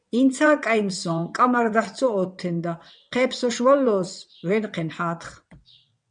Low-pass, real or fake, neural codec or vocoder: 9.9 kHz; fake; vocoder, 22.05 kHz, 80 mel bands, WaveNeXt